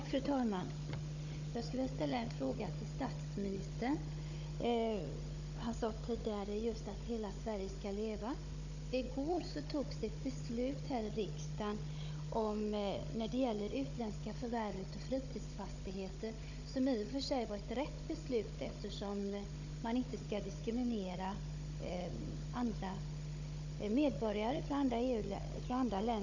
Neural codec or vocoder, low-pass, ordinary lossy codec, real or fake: codec, 16 kHz, 16 kbps, FunCodec, trained on Chinese and English, 50 frames a second; 7.2 kHz; none; fake